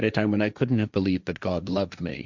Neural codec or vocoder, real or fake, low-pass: codec, 16 kHz, 1.1 kbps, Voila-Tokenizer; fake; 7.2 kHz